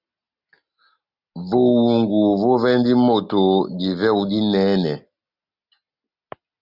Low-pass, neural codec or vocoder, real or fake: 5.4 kHz; none; real